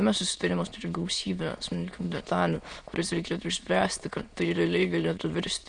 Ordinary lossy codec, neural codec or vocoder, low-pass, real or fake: Opus, 64 kbps; autoencoder, 22.05 kHz, a latent of 192 numbers a frame, VITS, trained on many speakers; 9.9 kHz; fake